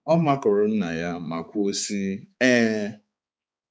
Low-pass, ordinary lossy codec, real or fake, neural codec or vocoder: none; none; fake; codec, 16 kHz, 4 kbps, X-Codec, HuBERT features, trained on balanced general audio